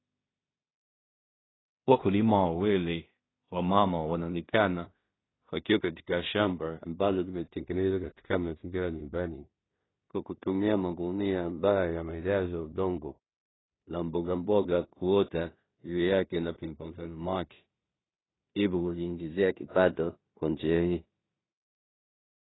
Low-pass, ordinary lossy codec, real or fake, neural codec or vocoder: 7.2 kHz; AAC, 16 kbps; fake; codec, 16 kHz in and 24 kHz out, 0.4 kbps, LongCat-Audio-Codec, two codebook decoder